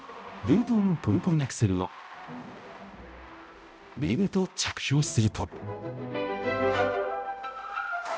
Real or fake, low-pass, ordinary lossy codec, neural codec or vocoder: fake; none; none; codec, 16 kHz, 0.5 kbps, X-Codec, HuBERT features, trained on balanced general audio